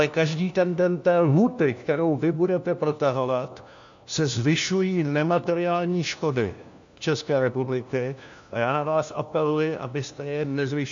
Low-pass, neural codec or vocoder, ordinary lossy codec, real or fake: 7.2 kHz; codec, 16 kHz, 1 kbps, FunCodec, trained on LibriTTS, 50 frames a second; AAC, 64 kbps; fake